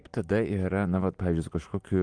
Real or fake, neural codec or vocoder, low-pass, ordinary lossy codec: real; none; 9.9 kHz; Opus, 24 kbps